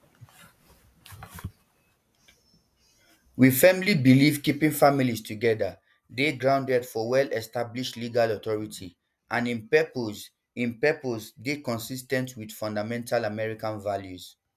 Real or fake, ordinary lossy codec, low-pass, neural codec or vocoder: real; none; 14.4 kHz; none